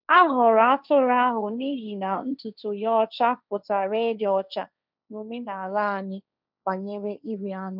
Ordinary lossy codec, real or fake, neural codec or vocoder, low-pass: none; fake; codec, 16 kHz, 1.1 kbps, Voila-Tokenizer; 5.4 kHz